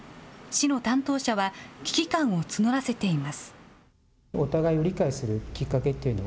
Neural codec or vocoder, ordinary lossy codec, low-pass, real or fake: none; none; none; real